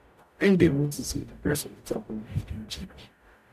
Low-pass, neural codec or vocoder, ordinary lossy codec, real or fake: 14.4 kHz; codec, 44.1 kHz, 0.9 kbps, DAC; none; fake